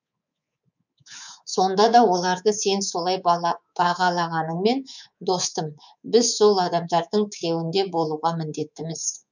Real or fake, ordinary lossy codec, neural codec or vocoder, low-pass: fake; none; codec, 24 kHz, 3.1 kbps, DualCodec; 7.2 kHz